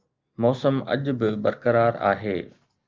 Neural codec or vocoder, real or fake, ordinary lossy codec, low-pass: none; real; Opus, 24 kbps; 7.2 kHz